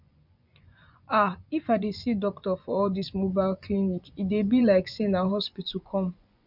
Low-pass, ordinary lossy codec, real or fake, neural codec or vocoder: 5.4 kHz; none; fake; vocoder, 24 kHz, 100 mel bands, Vocos